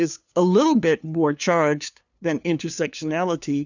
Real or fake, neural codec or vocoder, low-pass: fake; codec, 16 kHz, 2 kbps, FunCodec, trained on LibriTTS, 25 frames a second; 7.2 kHz